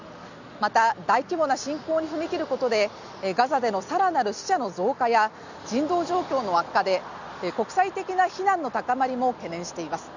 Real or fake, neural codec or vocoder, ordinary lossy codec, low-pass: real; none; none; 7.2 kHz